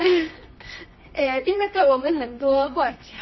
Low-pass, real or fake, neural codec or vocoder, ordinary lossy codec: 7.2 kHz; fake; codec, 24 kHz, 0.9 kbps, WavTokenizer, medium music audio release; MP3, 24 kbps